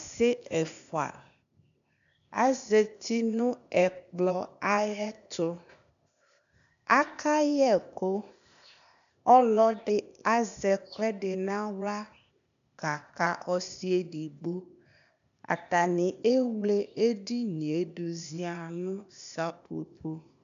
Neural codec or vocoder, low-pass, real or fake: codec, 16 kHz, 0.8 kbps, ZipCodec; 7.2 kHz; fake